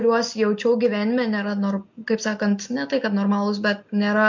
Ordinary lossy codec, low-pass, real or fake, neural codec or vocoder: MP3, 48 kbps; 7.2 kHz; real; none